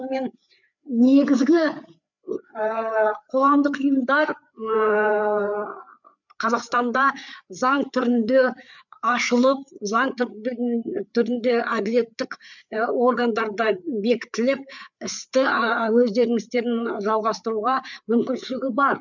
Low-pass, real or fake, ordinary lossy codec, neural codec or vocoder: 7.2 kHz; fake; none; codec, 16 kHz, 4 kbps, FreqCodec, larger model